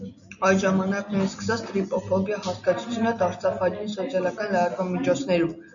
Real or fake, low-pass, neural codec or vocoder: real; 7.2 kHz; none